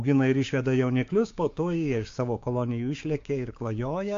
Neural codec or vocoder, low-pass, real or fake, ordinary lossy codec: codec, 16 kHz, 6 kbps, DAC; 7.2 kHz; fake; AAC, 48 kbps